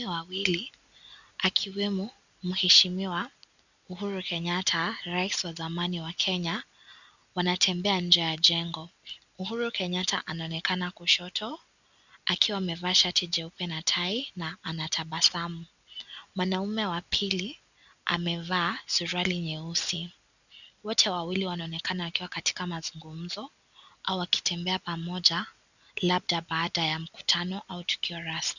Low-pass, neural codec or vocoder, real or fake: 7.2 kHz; none; real